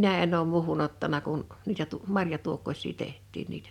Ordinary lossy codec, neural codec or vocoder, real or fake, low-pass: none; none; real; 19.8 kHz